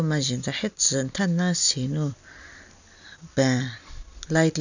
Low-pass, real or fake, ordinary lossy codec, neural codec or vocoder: 7.2 kHz; real; none; none